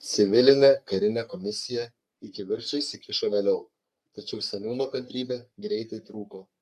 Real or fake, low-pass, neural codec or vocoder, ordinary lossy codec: fake; 14.4 kHz; codec, 44.1 kHz, 3.4 kbps, Pupu-Codec; AAC, 96 kbps